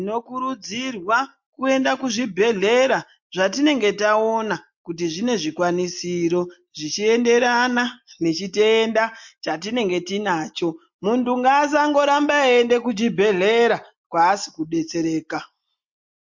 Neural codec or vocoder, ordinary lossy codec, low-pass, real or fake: none; MP3, 48 kbps; 7.2 kHz; real